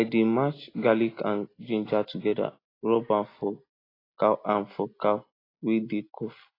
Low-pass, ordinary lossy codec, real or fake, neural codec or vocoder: 5.4 kHz; AAC, 24 kbps; real; none